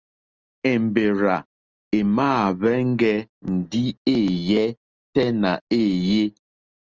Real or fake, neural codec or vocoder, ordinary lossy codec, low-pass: real; none; Opus, 24 kbps; 7.2 kHz